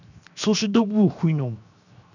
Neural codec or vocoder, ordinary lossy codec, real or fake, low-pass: codec, 16 kHz, 0.7 kbps, FocalCodec; none; fake; 7.2 kHz